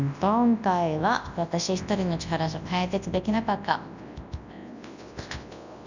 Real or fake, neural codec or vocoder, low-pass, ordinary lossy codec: fake; codec, 24 kHz, 0.9 kbps, WavTokenizer, large speech release; 7.2 kHz; none